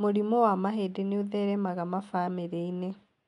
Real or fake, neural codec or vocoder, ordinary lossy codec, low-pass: real; none; none; 10.8 kHz